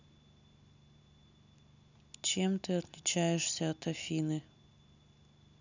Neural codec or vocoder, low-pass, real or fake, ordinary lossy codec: none; 7.2 kHz; real; none